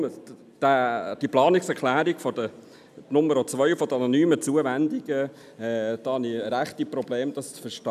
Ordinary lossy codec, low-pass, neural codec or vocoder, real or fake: none; 14.4 kHz; none; real